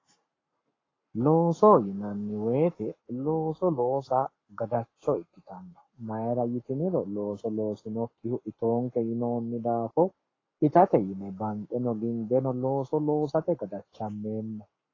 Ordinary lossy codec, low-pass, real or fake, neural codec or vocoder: AAC, 32 kbps; 7.2 kHz; fake; codec, 44.1 kHz, 7.8 kbps, Pupu-Codec